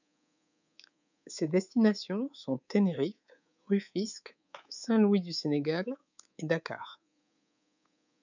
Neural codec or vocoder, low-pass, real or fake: codec, 24 kHz, 3.1 kbps, DualCodec; 7.2 kHz; fake